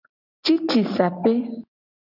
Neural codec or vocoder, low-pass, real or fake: none; 5.4 kHz; real